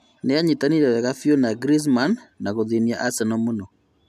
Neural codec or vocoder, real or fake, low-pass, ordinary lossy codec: none; real; 14.4 kHz; AAC, 96 kbps